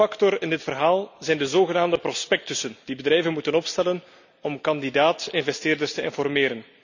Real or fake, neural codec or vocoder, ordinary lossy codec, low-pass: real; none; none; 7.2 kHz